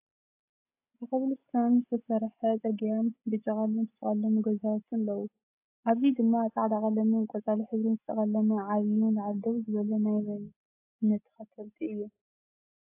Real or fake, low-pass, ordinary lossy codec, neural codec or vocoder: real; 3.6 kHz; AAC, 32 kbps; none